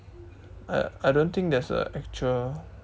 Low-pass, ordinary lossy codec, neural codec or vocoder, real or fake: none; none; none; real